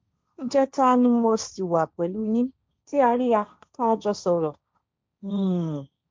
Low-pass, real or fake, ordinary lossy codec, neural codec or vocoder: none; fake; none; codec, 16 kHz, 1.1 kbps, Voila-Tokenizer